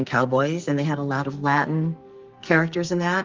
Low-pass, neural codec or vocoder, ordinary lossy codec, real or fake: 7.2 kHz; codec, 44.1 kHz, 2.6 kbps, SNAC; Opus, 32 kbps; fake